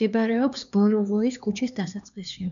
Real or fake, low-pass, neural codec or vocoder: fake; 7.2 kHz; codec, 16 kHz, 2 kbps, X-Codec, HuBERT features, trained on LibriSpeech